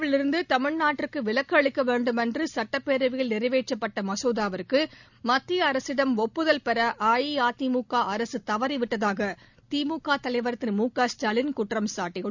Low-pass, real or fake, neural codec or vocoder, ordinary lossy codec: 7.2 kHz; real; none; none